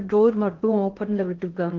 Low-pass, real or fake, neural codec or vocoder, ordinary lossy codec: 7.2 kHz; fake; codec, 16 kHz in and 24 kHz out, 0.6 kbps, FocalCodec, streaming, 2048 codes; Opus, 16 kbps